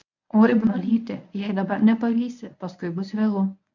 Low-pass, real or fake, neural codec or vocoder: 7.2 kHz; fake; codec, 24 kHz, 0.9 kbps, WavTokenizer, medium speech release version 1